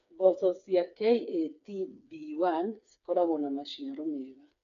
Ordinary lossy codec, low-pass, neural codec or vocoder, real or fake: none; 7.2 kHz; codec, 16 kHz, 4 kbps, FreqCodec, smaller model; fake